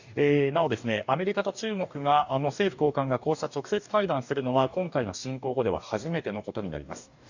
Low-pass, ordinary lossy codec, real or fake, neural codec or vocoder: 7.2 kHz; none; fake; codec, 44.1 kHz, 2.6 kbps, DAC